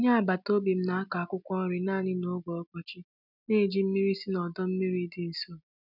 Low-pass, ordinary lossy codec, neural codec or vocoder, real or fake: 5.4 kHz; none; none; real